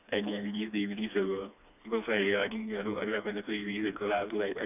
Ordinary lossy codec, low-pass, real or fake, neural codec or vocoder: none; 3.6 kHz; fake; codec, 16 kHz, 2 kbps, FreqCodec, smaller model